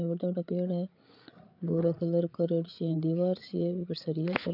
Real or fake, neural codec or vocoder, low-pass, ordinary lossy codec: fake; codec, 16 kHz, 8 kbps, FreqCodec, larger model; 5.4 kHz; none